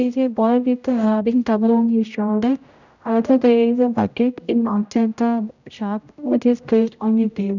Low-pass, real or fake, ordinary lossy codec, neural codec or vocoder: 7.2 kHz; fake; none; codec, 16 kHz, 0.5 kbps, X-Codec, HuBERT features, trained on general audio